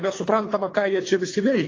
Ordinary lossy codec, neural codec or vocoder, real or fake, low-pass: AAC, 32 kbps; codec, 24 kHz, 3 kbps, HILCodec; fake; 7.2 kHz